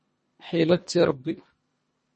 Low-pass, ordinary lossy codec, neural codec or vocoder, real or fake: 10.8 kHz; MP3, 32 kbps; codec, 24 kHz, 1.5 kbps, HILCodec; fake